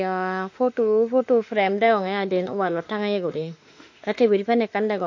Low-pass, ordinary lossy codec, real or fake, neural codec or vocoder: 7.2 kHz; AAC, 48 kbps; fake; autoencoder, 48 kHz, 32 numbers a frame, DAC-VAE, trained on Japanese speech